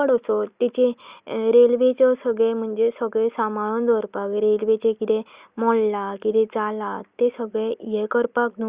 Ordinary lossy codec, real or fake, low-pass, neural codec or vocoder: Opus, 64 kbps; real; 3.6 kHz; none